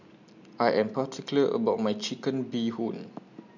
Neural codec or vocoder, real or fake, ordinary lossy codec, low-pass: none; real; none; 7.2 kHz